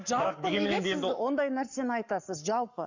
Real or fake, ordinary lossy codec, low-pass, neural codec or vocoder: fake; none; 7.2 kHz; codec, 44.1 kHz, 7.8 kbps, Pupu-Codec